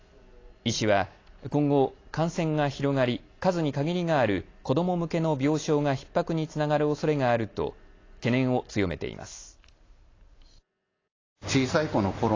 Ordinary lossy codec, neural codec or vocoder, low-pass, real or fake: AAC, 32 kbps; none; 7.2 kHz; real